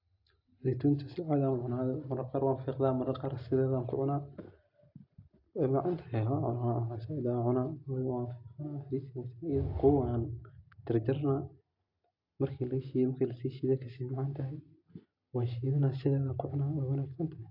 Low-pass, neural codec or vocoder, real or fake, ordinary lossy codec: 5.4 kHz; none; real; AAC, 48 kbps